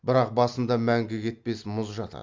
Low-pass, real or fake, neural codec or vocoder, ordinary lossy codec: 7.2 kHz; real; none; Opus, 32 kbps